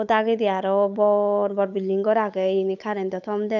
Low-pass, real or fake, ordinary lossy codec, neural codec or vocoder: 7.2 kHz; fake; none; codec, 16 kHz, 8 kbps, FunCodec, trained on Chinese and English, 25 frames a second